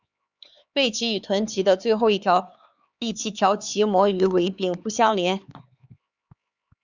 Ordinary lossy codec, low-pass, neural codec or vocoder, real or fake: Opus, 64 kbps; 7.2 kHz; codec, 16 kHz, 4 kbps, X-Codec, HuBERT features, trained on LibriSpeech; fake